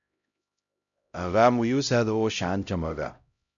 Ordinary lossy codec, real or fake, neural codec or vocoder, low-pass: MP3, 64 kbps; fake; codec, 16 kHz, 0.5 kbps, X-Codec, HuBERT features, trained on LibriSpeech; 7.2 kHz